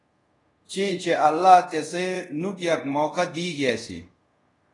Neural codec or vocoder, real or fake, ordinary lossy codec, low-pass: codec, 24 kHz, 0.5 kbps, DualCodec; fake; AAC, 32 kbps; 10.8 kHz